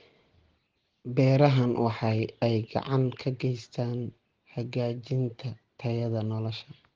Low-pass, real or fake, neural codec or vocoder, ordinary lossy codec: 7.2 kHz; real; none; Opus, 16 kbps